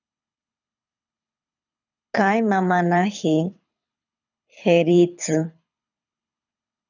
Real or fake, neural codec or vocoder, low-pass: fake; codec, 24 kHz, 6 kbps, HILCodec; 7.2 kHz